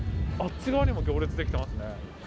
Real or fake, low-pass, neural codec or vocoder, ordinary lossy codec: real; none; none; none